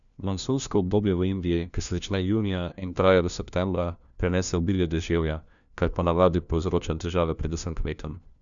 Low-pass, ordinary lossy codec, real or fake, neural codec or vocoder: 7.2 kHz; none; fake; codec, 16 kHz, 1 kbps, FunCodec, trained on LibriTTS, 50 frames a second